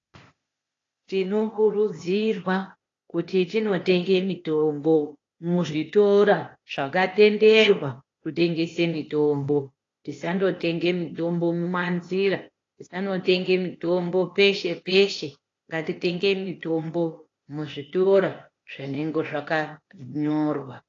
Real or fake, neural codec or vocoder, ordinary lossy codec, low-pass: fake; codec, 16 kHz, 0.8 kbps, ZipCodec; AAC, 32 kbps; 7.2 kHz